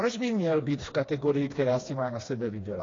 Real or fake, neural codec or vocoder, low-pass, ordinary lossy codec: fake; codec, 16 kHz, 2 kbps, FreqCodec, smaller model; 7.2 kHz; AAC, 32 kbps